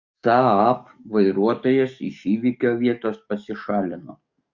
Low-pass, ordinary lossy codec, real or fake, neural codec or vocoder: 7.2 kHz; Opus, 64 kbps; fake; codec, 44.1 kHz, 7.8 kbps, DAC